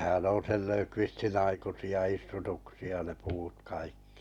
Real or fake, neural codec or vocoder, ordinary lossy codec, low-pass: real; none; none; 19.8 kHz